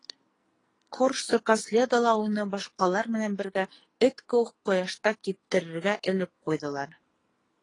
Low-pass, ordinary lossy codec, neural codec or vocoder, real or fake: 10.8 kHz; AAC, 32 kbps; codec, 44.1 kHz, 2.6 kbps, SNAC; fake